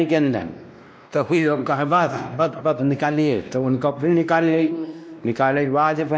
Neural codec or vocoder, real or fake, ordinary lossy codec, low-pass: codec, 16 kHz, 1 kbps, X-Codec, WavLM features, trained on Multilingual LibriSpeech; fake; none; none